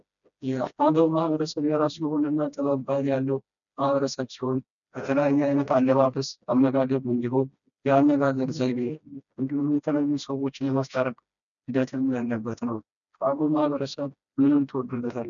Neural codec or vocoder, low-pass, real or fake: codec, 16 kHz, 1 kbps, FreqCodec, smaller model; 7.2 kHz; fake